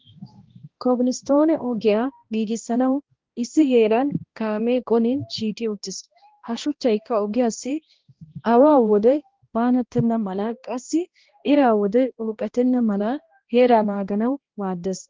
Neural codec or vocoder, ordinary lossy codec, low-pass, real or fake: codec, 16 kHz, 1 kbps, X-Codec, HuBERT features, trained on balanced general audio; Opus, 16 kbps; 7.2 kHz; fake